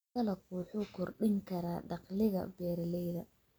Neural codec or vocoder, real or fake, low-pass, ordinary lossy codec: none; real; none; none